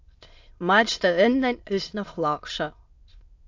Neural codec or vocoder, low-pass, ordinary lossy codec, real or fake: autoencoder, 22.05 kHz, a latent of 192 numbers a frame, VITS, trained on many speakers; 7.2 kHz; AAC, 48 kbps; fake